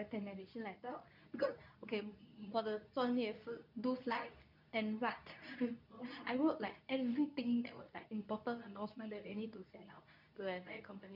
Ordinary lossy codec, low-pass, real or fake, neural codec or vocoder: none; 5.4 kHz; fake; codec, 24 kHz, 0.9 kbps, WavTokenizer, medium speech release version 2